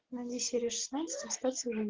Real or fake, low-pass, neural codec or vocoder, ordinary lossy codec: real; 7.2 kHz; none; Opus, 16 kbps